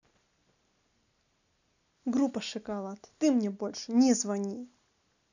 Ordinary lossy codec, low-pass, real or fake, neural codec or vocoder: none; 7.2 kHz; real; none